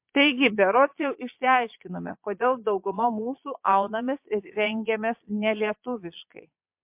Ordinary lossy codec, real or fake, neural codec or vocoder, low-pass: MP3, 32 kbps; fake; vocoder, 22.05 kHz, 80 mel bands, Vocos; 3.6 kHz